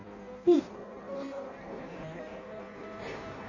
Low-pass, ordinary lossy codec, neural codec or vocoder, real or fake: 7.2 kHz; none; codec, 16 kHz in and 24 kHz out, 0.6 kbps, FireRedTTS-2 codec; fake